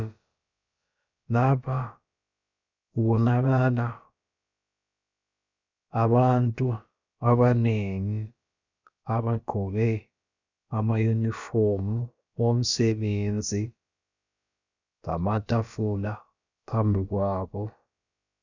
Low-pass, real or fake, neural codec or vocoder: 7.2 kHz; fake; codec, 16 kHz, about 1 kbps, DyCAST, with the encoder's durations